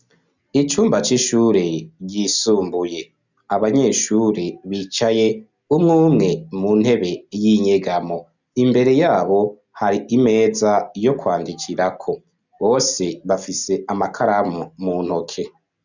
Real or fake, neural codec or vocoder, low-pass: real; none; 7.2 kHz